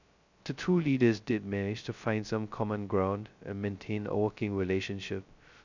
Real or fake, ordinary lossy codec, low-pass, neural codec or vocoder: fake; none; 7.2 kHz; codec, 16 kHz, 0.2 kbps, FocalCodec